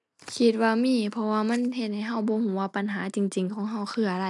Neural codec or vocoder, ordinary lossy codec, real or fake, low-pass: none; none; real; 10.8 kHz